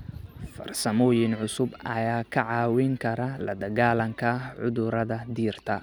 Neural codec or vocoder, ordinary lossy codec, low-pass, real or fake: none; none; none; real